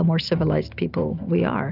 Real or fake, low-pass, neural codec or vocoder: real; 5.4 kHz; none